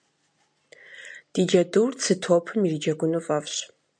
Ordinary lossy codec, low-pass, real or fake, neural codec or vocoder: MP3, 96 kbps; 9.9 kHz; real; none